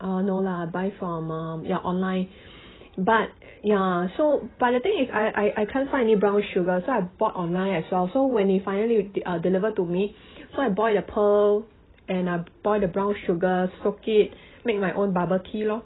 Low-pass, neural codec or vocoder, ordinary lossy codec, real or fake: 7.2 kHz; vocoder, 44.1 kHz, 128 mel bands every 512 samples, BigVGAN v2; AAC, 16 kbps; fake